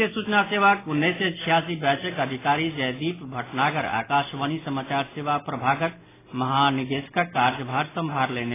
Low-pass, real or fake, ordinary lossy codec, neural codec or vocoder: 3.6 kHz; real; AAC, 16 kbps; none